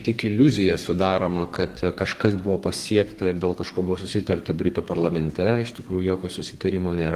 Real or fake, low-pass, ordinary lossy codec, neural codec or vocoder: fake; 14.4 kHz; Opus, 24 kbps; codec, 32 kHz, 1.9 kbps, SNAC